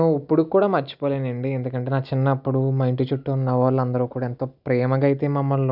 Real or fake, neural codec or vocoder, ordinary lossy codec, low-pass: real; none; none; 5.4 kHz